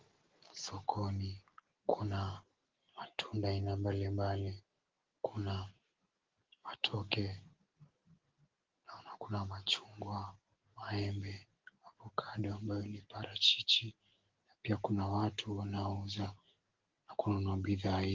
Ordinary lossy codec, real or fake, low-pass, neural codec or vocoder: Opus, 16 kbps; real; 7.2 kHz; none